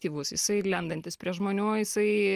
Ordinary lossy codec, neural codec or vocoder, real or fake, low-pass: Opus, 32 kbps; none; real; 14.4 kHz